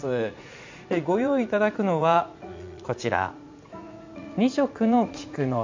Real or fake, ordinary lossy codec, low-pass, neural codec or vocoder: fake; MP3, 64 kbps; 7.2 kHz; vocoder, 44.1 kHz, 80 mel bands, Vocos